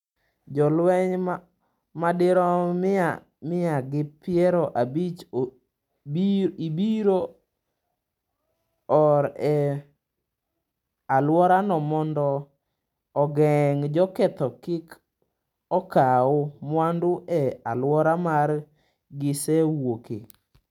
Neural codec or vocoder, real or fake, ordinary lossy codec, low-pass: none; real; none; 19.8 kHz